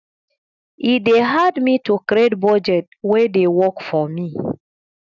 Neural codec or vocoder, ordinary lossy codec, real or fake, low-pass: none; none; real; 7.2 kHz